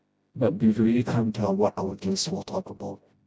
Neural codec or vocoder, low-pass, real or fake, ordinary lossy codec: codec, 16 kHz, 0.5 kbps, FreqCodec, smaller model; none; fake; none